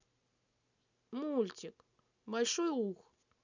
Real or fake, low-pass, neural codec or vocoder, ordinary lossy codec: real; 7.2 kHz; none; none